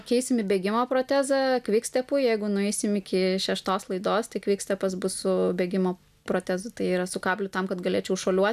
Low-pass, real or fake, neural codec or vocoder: 14.4 kHz; real; none